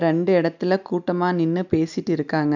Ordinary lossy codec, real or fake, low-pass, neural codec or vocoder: none; real; 7.2 kHz; none